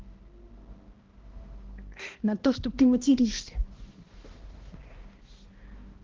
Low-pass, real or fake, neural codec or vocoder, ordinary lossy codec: 7.2 kHz; fake; codec, 16 kHz, 1 kbps, X-Codec, HuBERT features, trained on balanced general audio; Opus, 16 kbps